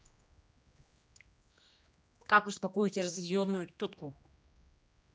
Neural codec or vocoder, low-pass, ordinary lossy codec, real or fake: codec, 16 kHz, 1 kbps, X-Codec, HuBERT features, trained on general audio; none; none; fake